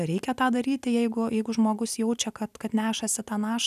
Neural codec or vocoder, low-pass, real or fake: none; 14.4 kHz; real